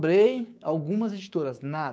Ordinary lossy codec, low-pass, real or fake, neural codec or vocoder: none; none; fake; codec, 16 kHz, 6 kbps, DAC